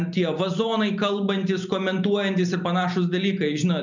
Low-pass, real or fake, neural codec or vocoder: 7.2 kHz; real; none